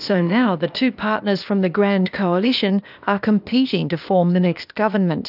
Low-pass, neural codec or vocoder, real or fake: 5.4 kHz; codec, 16 kHz, 0.8 kbps, ZipCodec; fake